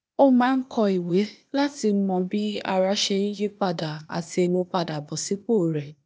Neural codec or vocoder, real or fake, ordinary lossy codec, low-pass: codec, 16 kHz, 0.8 kbps, ZipCodec; fake; none; none